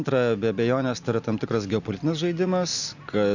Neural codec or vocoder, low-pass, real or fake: none; 7.2 kHz; real